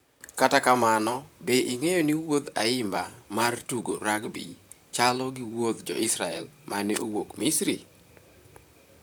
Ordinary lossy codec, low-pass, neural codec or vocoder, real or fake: none; none; vocoder, 44.1 kHz, 128 mel bands, Pupu-Vocoder; fake